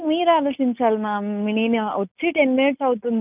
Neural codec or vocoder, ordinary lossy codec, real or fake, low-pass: none; none; real; 3.6 kHz